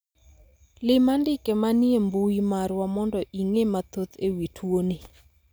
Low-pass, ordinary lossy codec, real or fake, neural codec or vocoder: none; none; real; none